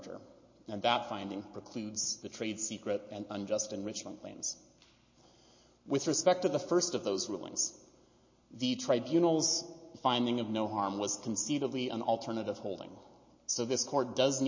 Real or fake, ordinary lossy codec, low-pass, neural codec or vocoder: real; MP3, 32 kbps; 7.2 kHz; none